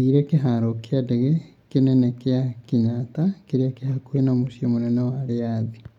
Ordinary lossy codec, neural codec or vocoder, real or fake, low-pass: none; none; real; 19.8 kHz